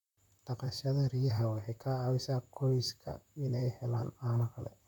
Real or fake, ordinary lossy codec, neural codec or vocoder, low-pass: fake; none; vocoder, 44.1 kHz, 128 mel bands, Pupu-Vocoder; 19.8 kHz